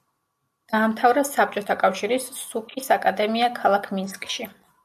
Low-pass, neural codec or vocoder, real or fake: 14.4 kHz; none; real